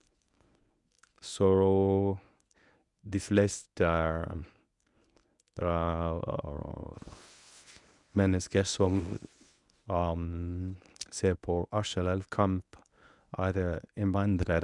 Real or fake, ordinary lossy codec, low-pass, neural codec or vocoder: fake; none; 10.8 kHz; codec, 24 kHz, 0.9 kbps, WavTokenizer, medium speech release version 1